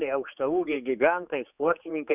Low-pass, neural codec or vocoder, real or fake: 3.6 kHz; codec, 16 kHz, 4 kbps, X-Codec, HuBERT features, trained on general audio; fake